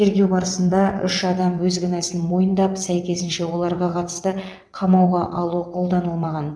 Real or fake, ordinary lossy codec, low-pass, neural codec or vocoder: fake; none; none; vocoder, 22.05 kHz, 80 mel bands, WaveNeXt